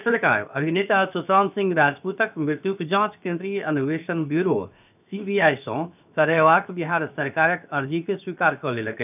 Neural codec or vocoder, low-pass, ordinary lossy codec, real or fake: codec, 16 kHz, 0.7 kbps, FocalCodec; 3.6 kHz; none; fake